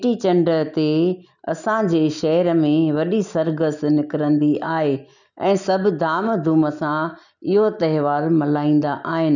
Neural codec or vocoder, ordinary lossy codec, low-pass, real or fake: none; none; 7.2 kHz; real